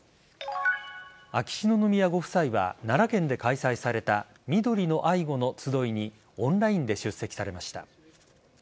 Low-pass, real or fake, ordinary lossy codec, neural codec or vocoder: none; real; none; none